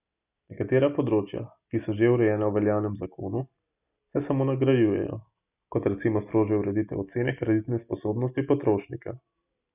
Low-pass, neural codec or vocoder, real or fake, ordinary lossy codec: 3.6 kHz; none; real; none